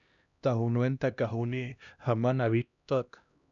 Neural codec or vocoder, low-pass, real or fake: codec, 16 kHz, 1 kbps, X-Codec, HuBERT features, trained on LibriSpeech; 7.2 kHz; fake